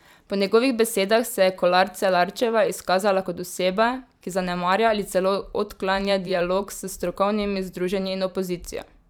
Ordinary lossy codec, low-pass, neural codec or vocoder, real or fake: none; 19.8 kHz; vocoder, 44.1 kHz, 128 mel bands every 512 samples, BigVGAN v2; fake